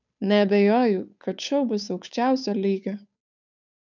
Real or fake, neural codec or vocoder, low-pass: fake; codec, 16 kHz, 8 kbps, FunCodec, trained on Chinese and English, 25 frames a second; 7.2 kHz